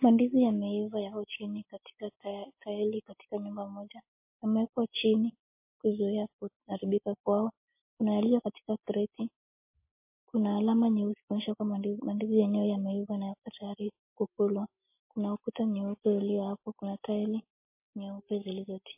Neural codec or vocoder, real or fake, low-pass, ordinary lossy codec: none; real; 3.6 kHz; MP3, 24 kbps